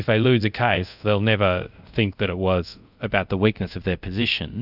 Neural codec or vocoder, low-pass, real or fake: codec, 24 kHz, 0.5 kbps, DualCodec; 5.4 kHz; fake